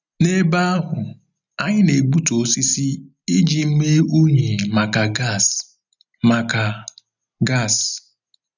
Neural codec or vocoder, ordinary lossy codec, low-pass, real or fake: none; none; 7.2 kHz; real